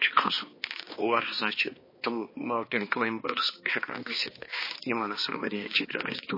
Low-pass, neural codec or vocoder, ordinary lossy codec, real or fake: 5.4 kHz; codec, 16 kHz, 2 kbps, X-Codec, HuBERT features, trained on balanced general audio; MP3, 24 kbps; fake